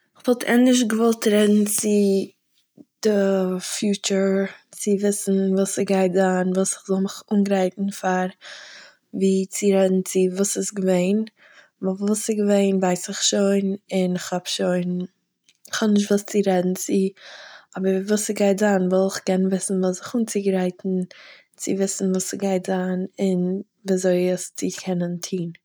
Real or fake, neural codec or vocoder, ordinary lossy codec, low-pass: real; none; none; none